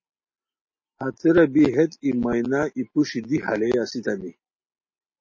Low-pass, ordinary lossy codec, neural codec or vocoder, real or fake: 7.2 kHz; MP3, 32 kbps; none; real